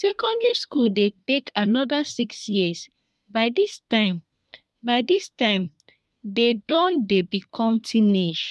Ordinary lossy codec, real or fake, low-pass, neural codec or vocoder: none; fake; none; codec, 24 kHz, 1 kbps, SNAC